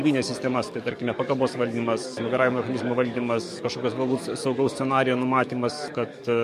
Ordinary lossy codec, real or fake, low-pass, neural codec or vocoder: MP3, 64 kbps; fake; 14.4 kHz; codec, 44.1 kHz, 7.8 kbps, DAC